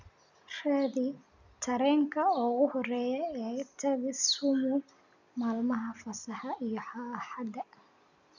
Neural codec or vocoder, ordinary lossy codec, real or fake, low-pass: none; none; real; 7.2 kHz